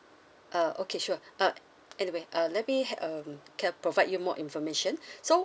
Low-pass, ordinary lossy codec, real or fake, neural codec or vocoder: none; none; real; none